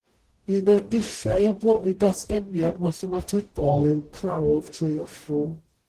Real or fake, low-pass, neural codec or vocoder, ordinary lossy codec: fake; 14.4 kHz; codec, 44.1 kHz, 0.9 kbps, DAC; Opus, 16 kbps